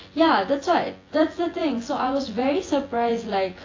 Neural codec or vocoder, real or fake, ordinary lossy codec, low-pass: vocoder, 24 kHz, 100 mel bands, Vocos; fake; AAC, 32 kbps; 7.2 kHz